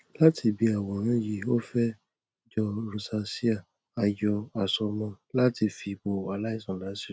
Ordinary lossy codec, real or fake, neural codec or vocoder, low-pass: none; real; none; none